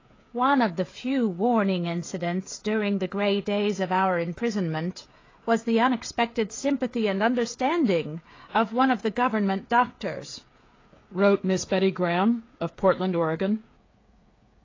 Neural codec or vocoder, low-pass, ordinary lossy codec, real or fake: codec, 16 kHz, 16 kbps, FreqCodec, smaller model; 7.2 kHz; AAC, 32 kbps; fake